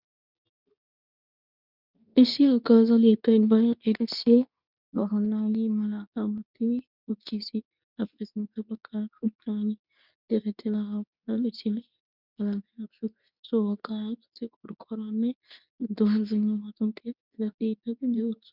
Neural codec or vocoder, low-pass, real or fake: codec, 24 kHz, 0.9 kbps, WavTokenizer, medium speech release version 2; 5.4 kHz; fake